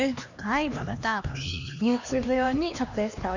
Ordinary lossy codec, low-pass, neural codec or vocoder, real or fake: none; 7.2 kHz; codec, 16 kHz, 2 kbps, X-Codec, HuBERT features, trained on LibriSpeech; fake